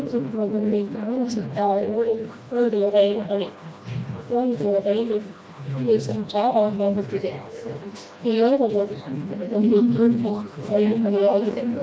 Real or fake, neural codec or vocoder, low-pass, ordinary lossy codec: fake; codec, 16 kHz, 1 kbps, FreqCodec, smaller model; none; none